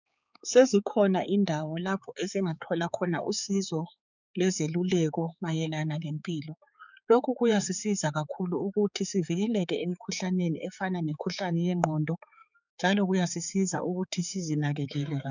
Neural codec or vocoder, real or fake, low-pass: codec, 16 kHz, 4 kbps, X-Codec, HuBERT features, trained on balanced general audio; fake; 7.2 kHz